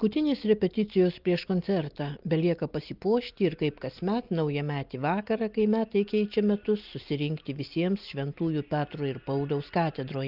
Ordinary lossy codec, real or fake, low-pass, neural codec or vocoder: Opus, 32 kbps; real; 5.4 kHz; none